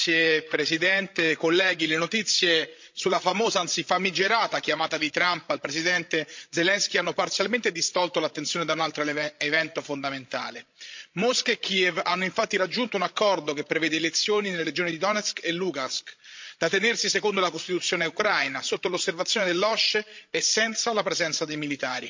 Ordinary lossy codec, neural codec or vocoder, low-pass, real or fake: MP3, 48 kbps; codec, 16 kHz, 16 kbps, FreqCodec, larger model; 7.2 kHz; fake